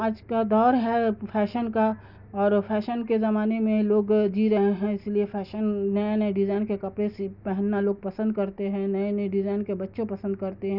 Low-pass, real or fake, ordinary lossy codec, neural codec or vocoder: 5.4 kHz; real; none; none